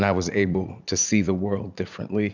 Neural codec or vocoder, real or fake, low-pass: vocoder, 44.1 kHz, 80 mel bands, Vocos; fake; 7.2 kHz